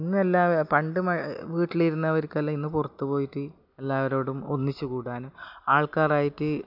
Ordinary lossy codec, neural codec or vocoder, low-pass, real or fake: AAC, 48 kbps; none; 5.4 kHz; real